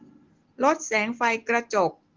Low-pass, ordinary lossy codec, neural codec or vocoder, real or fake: 7.2 kHz; Opus, 16 kbps; none; real